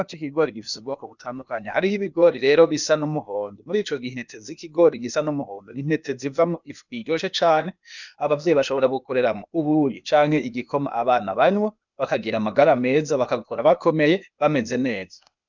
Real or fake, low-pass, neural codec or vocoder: fake; 7.2 kHz; codec, 16 kHz, 0.8 kbps, ZipCodec